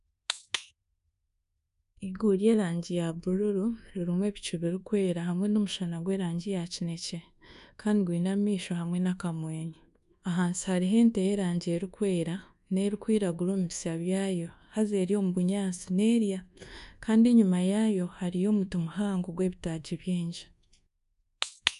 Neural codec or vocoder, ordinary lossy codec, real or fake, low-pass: codec, 24 kHz, 1.2 kbps, DualCodec; none; fake; 10.8 kHz